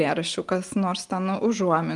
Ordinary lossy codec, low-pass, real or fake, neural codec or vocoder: Opus, 32 kbps; 10.8 kHz; real; none